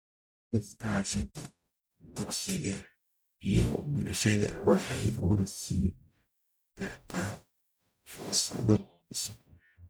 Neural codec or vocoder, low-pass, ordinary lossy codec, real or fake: codec, 44.1 kHz, 0.9 kbps, DAC; none; none; fake